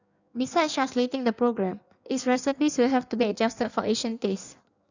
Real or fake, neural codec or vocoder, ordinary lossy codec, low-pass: fake; codec, 16 kHz in and 24 kHz out, 1.1 kbps, FireRedTTS-2 codec; none; 7.2 kHz